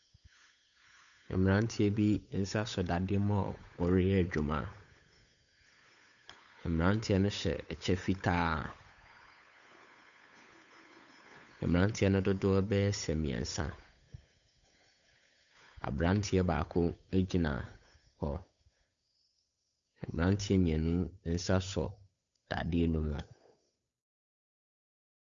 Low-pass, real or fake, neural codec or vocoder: 7.2 kHz; fake; codec, 16 kHz, 8 kbps, FunCodec, trained on Chinese and English, 25 frames a second